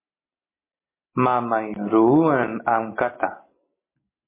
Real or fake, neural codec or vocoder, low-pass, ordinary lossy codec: real; none; 3.6 kHz; MP3, 16 kbps